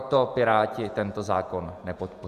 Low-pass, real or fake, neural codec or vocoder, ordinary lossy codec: 14.4 kHz; fake; vocoder, 48 kHz, 128 mel bands, Vocos; MP3, 96 kbps